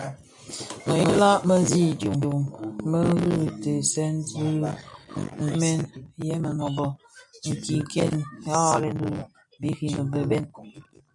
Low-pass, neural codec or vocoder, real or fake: 10.8 kHz; none; real